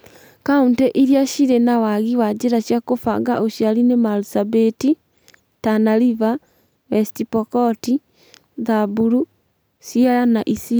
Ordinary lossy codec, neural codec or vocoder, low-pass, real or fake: none; none; none; real